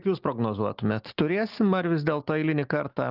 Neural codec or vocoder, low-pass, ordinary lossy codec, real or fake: none; 5.4 kHz; Opus, 32 kbps; real